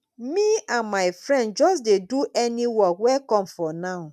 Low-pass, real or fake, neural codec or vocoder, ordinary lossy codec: 14.4 kHz; real; none; none